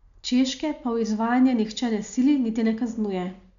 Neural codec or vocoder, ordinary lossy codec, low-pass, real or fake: none; none; 7.2 kHz; real